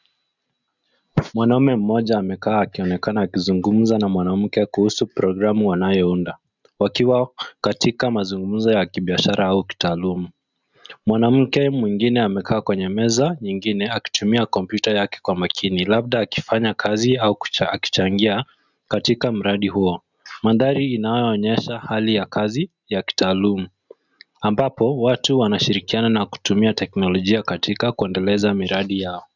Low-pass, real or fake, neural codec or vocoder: 7.2 kHz; real; none